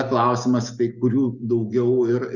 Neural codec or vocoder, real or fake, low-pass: none; real; 7.2 kHz